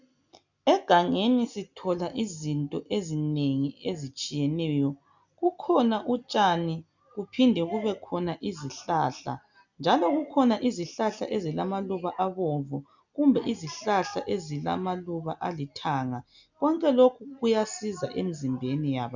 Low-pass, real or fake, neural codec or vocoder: 7.2 kHz; real; none